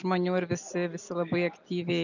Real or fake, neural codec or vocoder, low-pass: real; none; 7.2 kHz